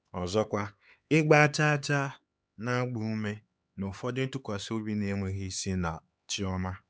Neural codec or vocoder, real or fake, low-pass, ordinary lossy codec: codec, 16 kHz, 4 kbps, X-Codec, HuBERT features, trained on LibriSpeech; fake; none; none